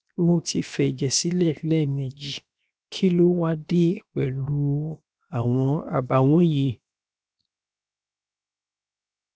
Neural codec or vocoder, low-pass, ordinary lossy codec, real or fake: codec, 16 kHz, 0.7 kbps, FocalCodec; none; none; fake